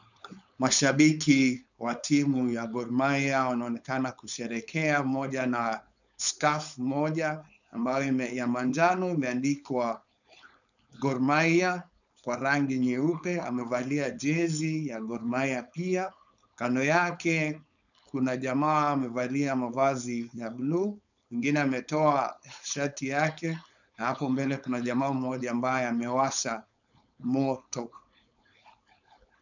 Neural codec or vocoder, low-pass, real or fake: codec, 16 kHz, 4.8 kbps, FACodec; 7.2 kHz; fake